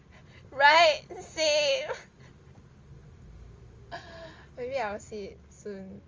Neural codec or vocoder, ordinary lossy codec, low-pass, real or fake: none; Opus, 32 kbps; 7.2 kHz; real